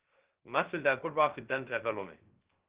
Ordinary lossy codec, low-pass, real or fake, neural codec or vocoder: Opus, 16 kbps; 3.6 kHz; fake; codec, 16 kHz, 0.3 kbps, FocalCodec